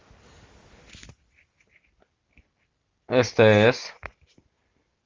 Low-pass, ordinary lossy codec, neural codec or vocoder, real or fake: 7.2 kHz; Opus, 32 kbps; none; real